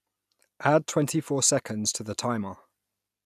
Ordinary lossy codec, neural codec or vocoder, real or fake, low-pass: none; none; real; 14.4 kHz